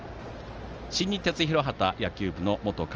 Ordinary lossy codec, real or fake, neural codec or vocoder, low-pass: Opus, 24 kbps; real; none; 7.2 kHz